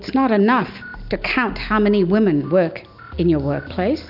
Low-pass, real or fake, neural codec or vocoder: 5.4 kHz; real; none